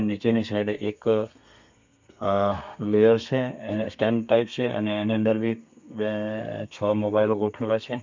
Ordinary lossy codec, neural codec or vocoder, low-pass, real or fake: MP3, 64 kbps; codec, 32 kHz, 1.9 kbps, SNAC; 7.2 kHz; fake